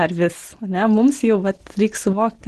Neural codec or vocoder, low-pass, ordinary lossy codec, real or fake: none; 10.8 kHz; Opus, 16 kbps; real